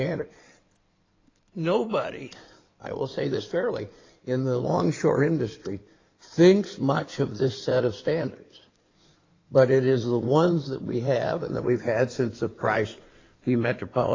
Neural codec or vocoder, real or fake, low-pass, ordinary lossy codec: codec, 16 kHz in and 24 kHz out, 2.2 kbps, FireRedTTS-2 codec; fake; 7.2 kHz; AAC, 32 kbps